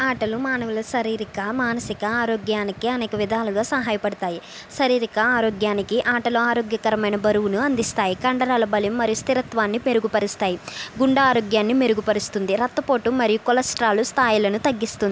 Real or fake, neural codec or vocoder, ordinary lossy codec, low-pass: real; none; none; none